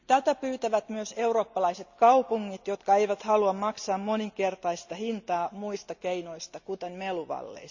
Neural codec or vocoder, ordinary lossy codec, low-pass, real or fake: none; Opus, 64 kbps; 7.2 kHz; real